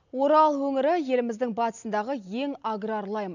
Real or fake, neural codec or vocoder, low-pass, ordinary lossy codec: real; none; 7.2 kHz; none